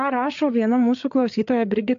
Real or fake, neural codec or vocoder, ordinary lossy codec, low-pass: fake; codec, 16 kHz, 8 kbps, FreqCodec, larger model; AAC, 64 kbps; 7.2 kHz